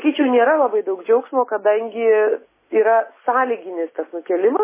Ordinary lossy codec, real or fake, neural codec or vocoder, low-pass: MP3, 16 kbps; real; none; 3.6 kHz